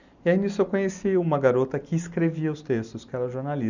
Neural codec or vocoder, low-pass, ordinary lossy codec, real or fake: none; 7.2 kHz; none; real